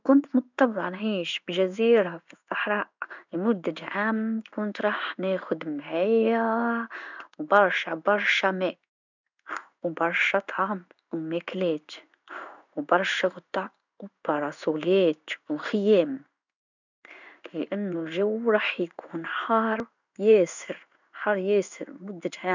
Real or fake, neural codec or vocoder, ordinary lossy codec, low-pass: fake; codec, 16 kHz in and 24 kHz out, 1 kbps, XY-Tokenizer; none; 7.2 kHz